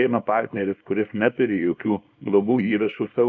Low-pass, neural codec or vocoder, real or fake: 7.2 kHz; codec, 24 kHz, 0.9 kbps, WavTokenizer, small release; fake